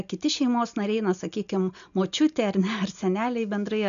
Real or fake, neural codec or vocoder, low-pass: real; none; 7.2 kHz